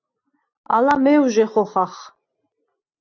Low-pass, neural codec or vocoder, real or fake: 7.2 kHz; none; real